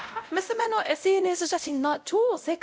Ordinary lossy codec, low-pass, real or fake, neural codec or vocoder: none; none; fake; codec, 16 kHz, 0.5 kbps, X-Codec, WavLM features, trained on Multilingual LibriSpeech